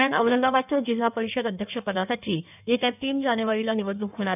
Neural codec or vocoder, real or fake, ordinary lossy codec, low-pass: codec, 16 kHz in and 24 kHz out, 1.1 kbps, FireRedTTS-2 codec; fake; none; 3.6 kHz